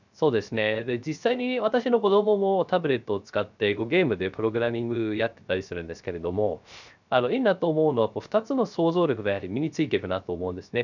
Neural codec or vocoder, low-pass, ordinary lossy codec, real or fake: codec, 16 kHz, 0.3 kbps, FocalCodec; 7.2 kHz; none; fake